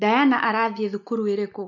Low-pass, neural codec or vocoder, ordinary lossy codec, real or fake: 7.2 kHz; none; none; real